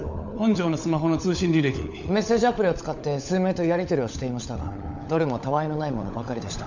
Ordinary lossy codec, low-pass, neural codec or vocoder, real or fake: none; 7.2 kHz; codec, 16 kHz, 16 kbps, FunCodec, trained on LibriTTS, 50 frames a second; fake